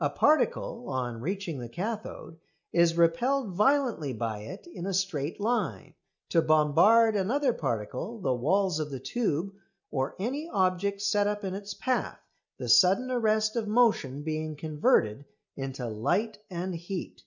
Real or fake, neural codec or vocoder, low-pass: real; none; 7.2 kHz